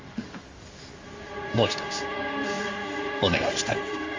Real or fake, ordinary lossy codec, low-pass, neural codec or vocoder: fake; Opus, 32 kbps; 7.2 kHz; codec, 16 kHz in and 24 kHz out, 1 kbps, XY-Tokenizer